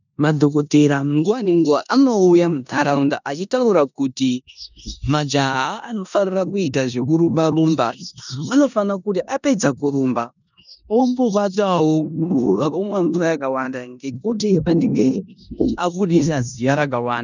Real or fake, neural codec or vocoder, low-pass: fake; codec, 16 kHz in and 24 kHz out, 0.9 kbps, LongCat-Audio-Codec, four codebook decoder; 7.2 kHz